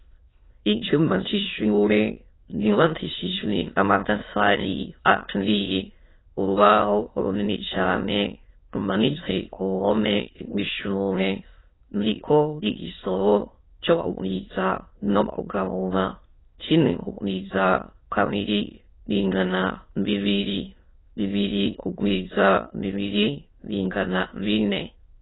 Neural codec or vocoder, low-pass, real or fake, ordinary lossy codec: autoencoder, 22.05 kHz, a latent of 192 numbers a frame, VITS, trained on many speakers; 7.2 kHz; fake; AAC, 16 kbps